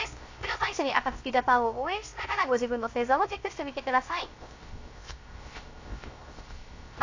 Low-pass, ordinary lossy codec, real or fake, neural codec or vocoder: 7.2 kHz; AAC, 48 kbps; fake; codec, 16 kHz, 0.3 kbps, FocalCodec